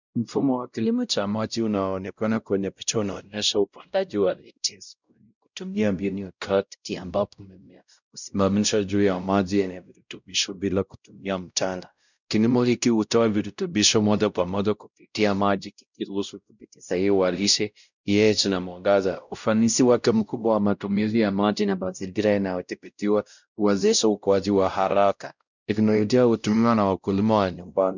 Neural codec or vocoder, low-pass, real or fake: codec, 16 kHz, 0.5 kbps, X-Codec, WavLM features, trained on Multilingual LibriSpeech; 7.2 kHz; fake